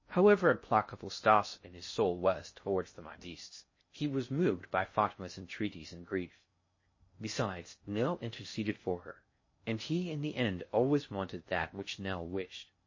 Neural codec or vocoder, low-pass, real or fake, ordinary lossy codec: codec, 16 kHz in and 24 kHz out, 0.6 kbps, FocalCodec, streaming, 2048 codes; 7.2 kHz; fake; MP3, 32 kbps